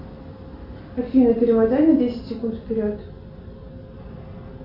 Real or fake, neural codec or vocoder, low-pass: real; none; 5.4 kHz